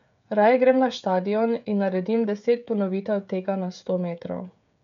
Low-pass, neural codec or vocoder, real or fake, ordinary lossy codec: 7.2 kHz; codec, 16 kHz, 16 kbps, FreqCodec, smaller model; fake; MP3, 64 kbps